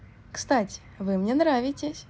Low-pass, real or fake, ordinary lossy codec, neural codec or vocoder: none; real; none; none